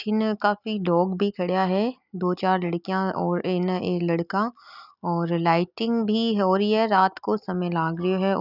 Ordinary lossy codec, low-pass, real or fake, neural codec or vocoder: none; 5.4 kHz; real; none